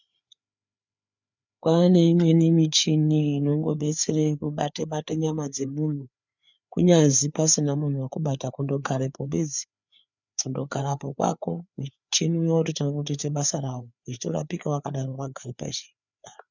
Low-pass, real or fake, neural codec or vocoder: 7.2 kHz; fake; codec, 16 kHz, 4 kbps, FreqCodec, larger model